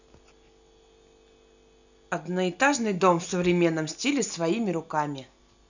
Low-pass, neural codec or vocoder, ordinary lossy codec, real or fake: 7.2 kHz; none; none; real